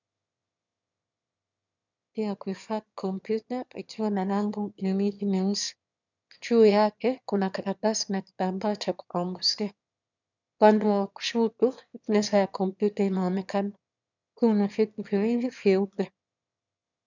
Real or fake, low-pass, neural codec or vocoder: fake; 7.2 kHz; autoencoder, 22.05 kHz, a latent of 192 numbers a frame, VITS, trained on one speaker